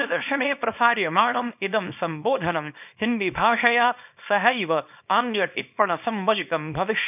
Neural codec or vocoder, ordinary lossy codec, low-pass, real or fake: codec, 24 kHz, 0.9 kbps, WavTokenizer, small release; none; 3.6 kHz; fake